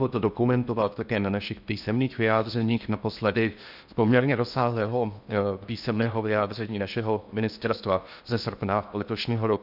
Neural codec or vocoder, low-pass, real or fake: codec, 16 kHz in and 24 kHz out, 0.6 kbps, FocalCodec, streaming, 4096 codes; 5.4 kHz; fake